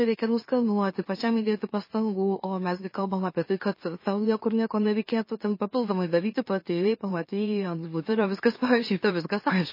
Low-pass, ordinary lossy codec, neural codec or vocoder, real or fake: 5.4 kHz; MP3, 24 kbps; autoencoder, 44.1 kHz, a latent of 192 numbers a frame, MeloTTS; fake